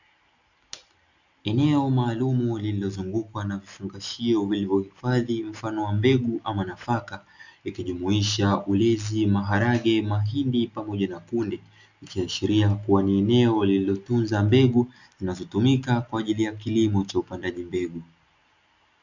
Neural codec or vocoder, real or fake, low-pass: none; real; 7.2 kHz